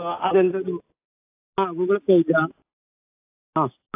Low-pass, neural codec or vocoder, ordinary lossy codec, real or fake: 3.6 kHz; none; AAC, 32 kbps; real